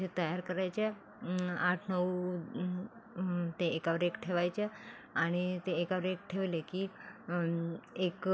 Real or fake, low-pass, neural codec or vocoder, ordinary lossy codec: real; none; none; none